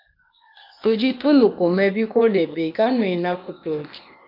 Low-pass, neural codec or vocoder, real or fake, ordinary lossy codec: 5.4 kHz; codec, 16 kHz, 0.8 kbps, ZipCodec; fake; MP3, 48 kbps